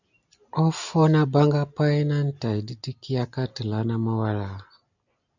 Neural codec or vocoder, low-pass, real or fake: none; 7.2 kHz; real